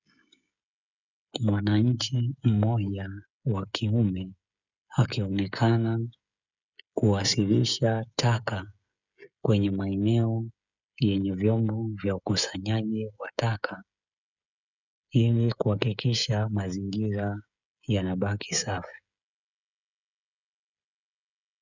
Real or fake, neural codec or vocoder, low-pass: fake; codec, 16 kHz, 16 kbps, FreqCodec, smaller model; 7.2 kHz